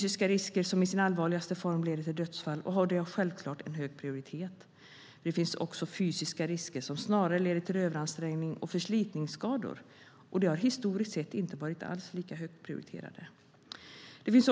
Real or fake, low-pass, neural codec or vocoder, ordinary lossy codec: real; none; none; none